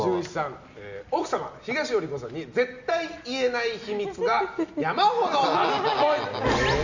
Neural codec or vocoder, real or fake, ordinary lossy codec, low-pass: none; real; Opus, 64 kbps; 7.2 kHz